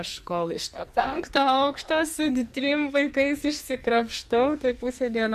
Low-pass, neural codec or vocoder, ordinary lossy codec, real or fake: 14.4 kHz; codec, 44.1 kHz, 2.6 kbps, SNAC; MP3, 64 kbps; fake